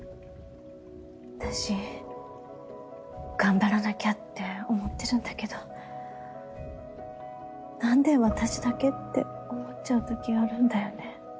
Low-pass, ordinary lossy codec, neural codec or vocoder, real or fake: none; none; none; real